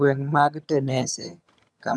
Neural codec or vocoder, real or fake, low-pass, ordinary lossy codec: vocoder, 22.05 kHz, 80 mel bands, HiFi-GAN; fake; none; none